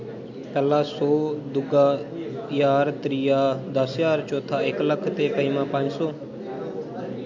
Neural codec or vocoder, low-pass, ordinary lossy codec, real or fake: none; 7.2 kHz; MP3, 48 kbps; real